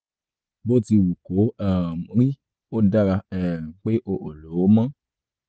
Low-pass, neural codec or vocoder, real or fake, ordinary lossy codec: none; none; real; none